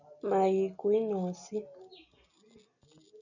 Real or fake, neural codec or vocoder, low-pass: real; none; 7.2 kHz